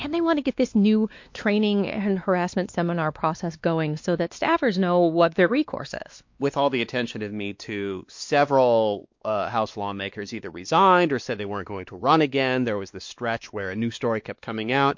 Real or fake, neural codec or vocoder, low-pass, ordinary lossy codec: fake; codec, 16 kHz, 2 kbps, X-Codec, WavLM features, trained on Multilingual LibriSpeech; 7.2 kHz; MP3, 48 kbps